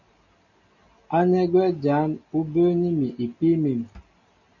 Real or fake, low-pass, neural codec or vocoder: real; 7.2 kHz; none